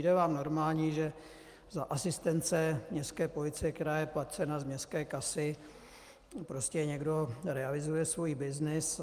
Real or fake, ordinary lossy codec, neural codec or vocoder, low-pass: real; Opus, 32 kbps; none; 14.4 kHz